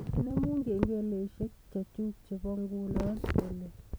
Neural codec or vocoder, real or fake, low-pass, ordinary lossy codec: vocoder, 44.1 kHz, 128 mel bands every 256 samples, BigVGAN v2; fake; none; none